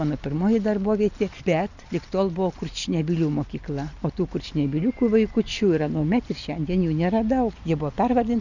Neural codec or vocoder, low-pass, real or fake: none; 7.2 kHz; real